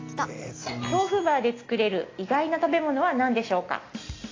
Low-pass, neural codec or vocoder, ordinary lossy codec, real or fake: 7.2 kHz; none; AAC, 32 kbps; real